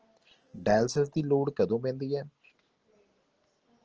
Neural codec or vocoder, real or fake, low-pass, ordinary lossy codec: none; real; 7.2 kHz; Opus, 16 kbps